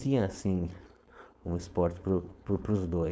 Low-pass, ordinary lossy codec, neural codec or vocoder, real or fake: none; none; codec, 16 kHz, 4.8 kbps, FACodec; fake